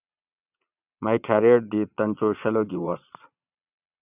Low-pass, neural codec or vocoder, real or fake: 3.6 kHz; vocoder, 22.05 kHz, 80 mel bands, Vocos; fake